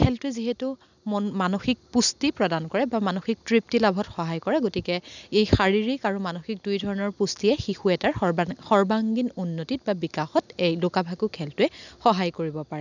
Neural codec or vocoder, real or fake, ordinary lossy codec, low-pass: none; real; none; 7.2 kHz